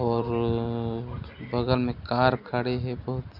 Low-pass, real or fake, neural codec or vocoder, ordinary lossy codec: 5.4 kHz; real; none; none